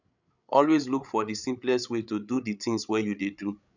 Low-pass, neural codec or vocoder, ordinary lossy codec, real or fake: 7.2 kHz; codec, 16 kHz, 8 kbps, FreqCodec, larger model; none; fake